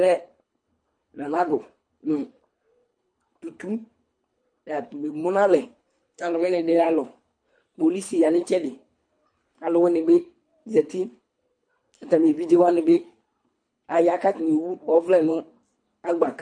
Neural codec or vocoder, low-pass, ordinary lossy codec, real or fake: codec, 24 kHz, 3 kbps, HILCodec; 9.9 kHz; MP3, 48 kbps; fake